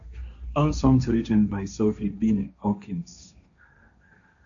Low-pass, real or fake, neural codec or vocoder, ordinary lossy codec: 7.2 kHz; fake; codec, 16 kHz, 1.1 kbps, Voila-Tokenizer; MP3, 96 kbps